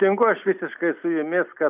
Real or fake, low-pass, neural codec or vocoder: real; 3.6 kHz; none